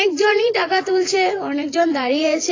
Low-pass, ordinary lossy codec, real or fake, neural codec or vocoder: 7.2 kHz; AAC, 32 kbps; fake; vocoder, 24 kHz, 100 mel bands, Vocos